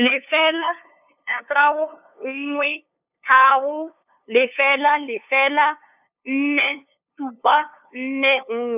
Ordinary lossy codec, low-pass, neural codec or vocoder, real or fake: none; 3.6 kHz; codec, 16 kHz, 2 kbps, FunCodec, trained on LibriTTS, 25 frames a second; fake